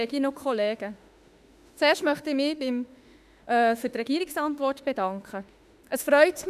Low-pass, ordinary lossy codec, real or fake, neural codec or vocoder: 14.4 kHz; none; fake; autoencoder, 48 kHz, 32 numbers a frame, DAC-VAE, trained on Japanese speech